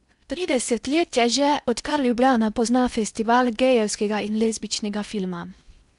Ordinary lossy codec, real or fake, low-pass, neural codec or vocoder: none; fake; 10.8 kHz; codec, 16 kHz in and 24 kHz out, 0.8 kbps, FocalCodec, streaming, 65536 codes